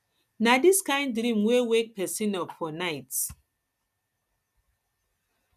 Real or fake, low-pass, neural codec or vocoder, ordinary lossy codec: real; 14.4 kHz; none; none